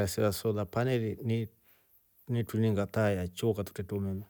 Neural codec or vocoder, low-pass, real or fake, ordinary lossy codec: none; none; real; none